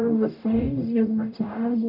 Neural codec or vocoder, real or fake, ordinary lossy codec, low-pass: codec, 44.1 kHz, 0.9 kbps, DAC; fake; none; 5.4 kHz